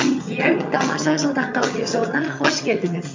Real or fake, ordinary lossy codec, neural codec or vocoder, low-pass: fake; MP3, 48 kbps; vocoder, 22.05 kHz, 80 mel bands, HiFi-GAN; 7.2 kHz